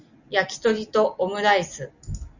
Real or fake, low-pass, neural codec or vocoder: real; 7.2 kHz; none